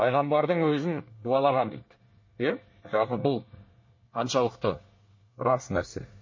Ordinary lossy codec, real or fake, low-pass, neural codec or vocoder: MP3, 32 kbps; fake; 7.2 kHz; codec, 24 kHz, 1 kbps, SNAC